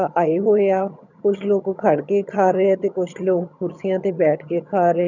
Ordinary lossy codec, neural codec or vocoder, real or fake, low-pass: none; vocoder, 22.05 kHz, 80 mel bands, HiFi-GAN; fake; 7.2 kHz